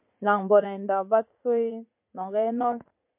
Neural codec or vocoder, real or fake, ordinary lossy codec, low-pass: vocoder, 44.1 kHz, 80 mel bands, Vocos; fake; MP3, 32 kbps; 3.6 kHz